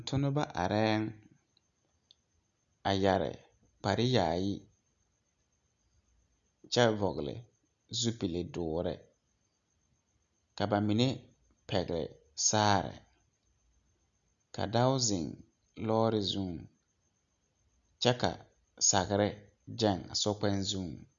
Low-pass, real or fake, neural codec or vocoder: 7.2 kHz; real; none